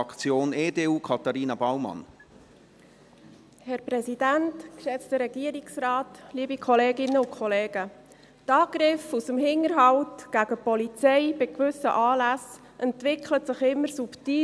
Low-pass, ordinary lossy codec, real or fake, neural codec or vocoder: none; none; real; none